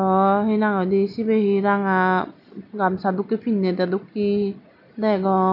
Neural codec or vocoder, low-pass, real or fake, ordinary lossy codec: none; 5.4 kHz; real; none